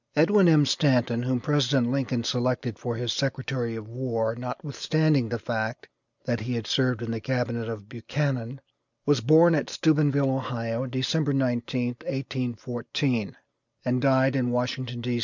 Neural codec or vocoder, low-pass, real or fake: none; 7.2 kHz; real